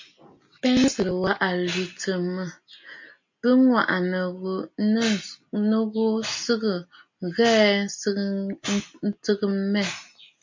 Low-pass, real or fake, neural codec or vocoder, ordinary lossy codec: 7.2 kHz; real; none; MP3, 48 kbps